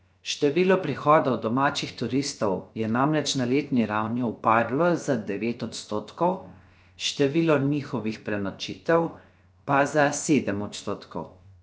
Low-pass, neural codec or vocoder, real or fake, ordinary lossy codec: none; codec, 16 kHz, 0.7 kbps, FocalCodec; fake; none